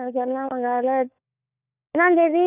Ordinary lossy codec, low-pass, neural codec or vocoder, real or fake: none; 3.6 kHz; codec, 16 kHz, 4 kbps, FunCodec, trained on LibriTTS, 50 frames a second; fake